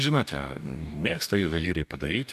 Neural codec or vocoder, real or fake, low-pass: codec, 44.1 kHz, 2.6 kbps, DAC; fake; 14.4 kHz